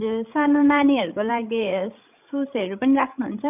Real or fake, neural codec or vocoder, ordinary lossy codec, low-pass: fake; codec, 16 kHz, 16 kbps, FreqCodec, larger model; none; 3.6 kHz